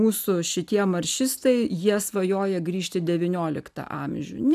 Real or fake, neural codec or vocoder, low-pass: real; none; 14.4 kHz